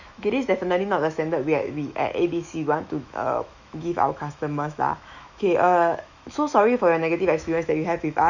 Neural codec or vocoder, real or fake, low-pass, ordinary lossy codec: autoencoder, 48 kHz, 128 numbers a frame, DAC-VAE, trained on Japanese speech; fake; 7.2 kHz; none